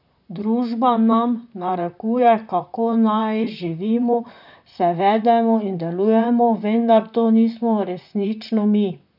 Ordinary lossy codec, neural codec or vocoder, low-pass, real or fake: none; vocoder, 44.1 kHz, 128 mel bands, Pupu-Vocoder; 5.4 kHz; fake